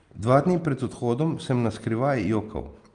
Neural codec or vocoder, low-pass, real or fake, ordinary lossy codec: none; 9.9 kHz; real; Opus, 32 kbps